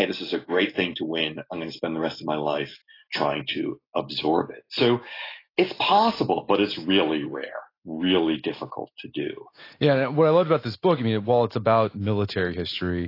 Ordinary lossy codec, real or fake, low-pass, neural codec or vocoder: AAC, 24 kbps; real; 5.4 kHz; none